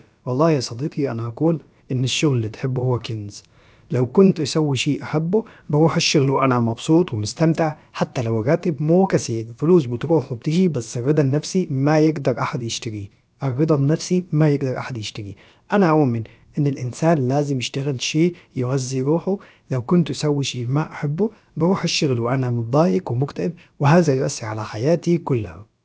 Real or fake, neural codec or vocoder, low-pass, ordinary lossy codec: fake; codec, 16 kHz, about 1 kbps, DyCAST, with the encoder's durations; none; none